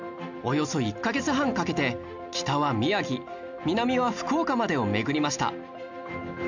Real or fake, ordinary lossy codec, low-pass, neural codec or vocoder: real; none; 7.2 kHz; none